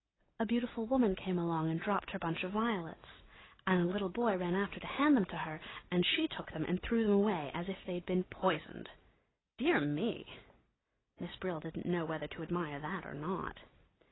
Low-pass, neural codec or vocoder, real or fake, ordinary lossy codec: 7.2 kHz; none; real; AAC, 16 kbps